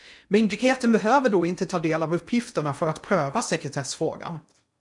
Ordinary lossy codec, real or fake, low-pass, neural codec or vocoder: MP3, 96 kbps; fake; 10.8 kHz; codec, 16 kHz in and 24 kHz out, 0.8 kbps, FocalCodec, streaming, 65536 codes